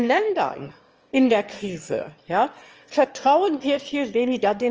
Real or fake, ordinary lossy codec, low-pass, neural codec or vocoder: fake; Opus, 24 kbps; 7.2 kHz; autoencoder, 22.05 kHz, a latent of 192 numbers a frame, VITS, trained on one speaker